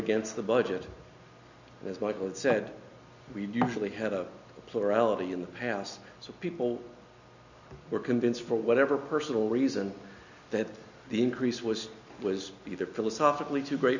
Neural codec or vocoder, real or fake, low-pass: none; real; 7.2 kHz